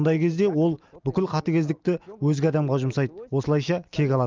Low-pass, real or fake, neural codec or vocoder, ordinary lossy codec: 7.2 kHz; real; none; Opus, 24 kbps